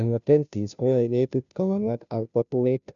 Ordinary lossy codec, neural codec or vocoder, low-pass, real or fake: none; codec, 16 kHz, 0.5 kbps, FunCodec, trained on Chinese and English, 25 frames a second; 7.2 kHz; fake